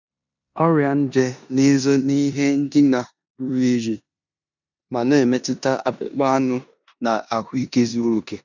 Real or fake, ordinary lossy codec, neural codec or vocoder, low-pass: fake; none; codec, 16 kHz in and 24 kHz out, 0.9 kbps, LongCat-Audio-Codec, four codebook decoder; 7.2 kHz